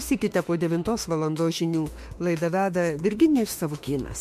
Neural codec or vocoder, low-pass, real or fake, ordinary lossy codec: autoencoder, 48 kHz, 32 numbers a frame, DAC-VAE, trained on Japanese speech; 14.4 kHz; fake; MP3, 64 kbps